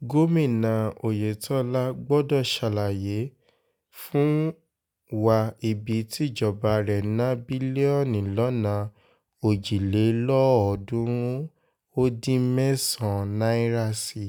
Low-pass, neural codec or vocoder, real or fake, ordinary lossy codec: none; none; real; none